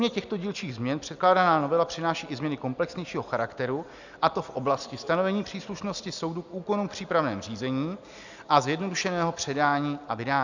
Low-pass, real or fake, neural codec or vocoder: 7.2 kHz; real; none